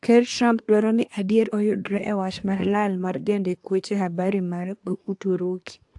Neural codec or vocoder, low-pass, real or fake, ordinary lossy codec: codec, 24 kHz, 1 kbps, SNAC; 10.8 kHz; fake; AAC, 64 kbps